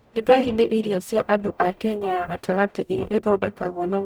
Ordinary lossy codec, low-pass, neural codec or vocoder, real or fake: none; none; codec, 44.1 kHz, 0.9 kbps, DAC; fake